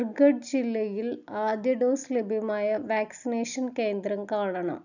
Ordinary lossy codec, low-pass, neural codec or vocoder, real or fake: none; 7.2 kHz; none; real